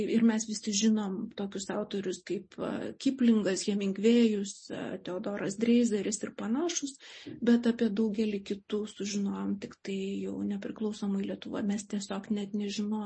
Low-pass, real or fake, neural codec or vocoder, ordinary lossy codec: 9.9 kHz; real; none; MP3, 32 kbps